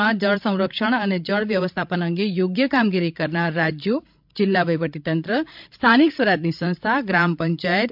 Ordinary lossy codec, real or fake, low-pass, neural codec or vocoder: MP3, 48 kbps; fake; 5.4 kHz; codec, 16 kHz, 16 kbps, FreqCodec, larger model